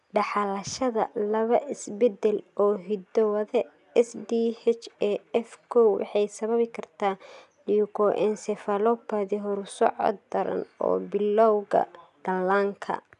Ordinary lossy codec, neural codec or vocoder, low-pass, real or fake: none; none; 10.8 kHz; real